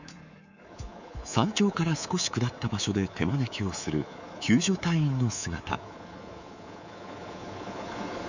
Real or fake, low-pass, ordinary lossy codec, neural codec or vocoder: fake; 7.2 kHz; none; codec, 24 kHz, 3.1 kbps, DualCodec